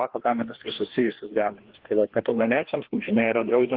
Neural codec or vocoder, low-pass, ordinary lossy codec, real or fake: codec, 16 kHz, 2 kbps, FreqCodec, larger model; 5.4 kHz; Opus, 16 kbps; fake